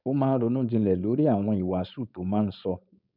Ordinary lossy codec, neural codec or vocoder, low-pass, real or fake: none; codec, 16 kHz, 4.8 kbps, FACodec; 5.4 kHz; fake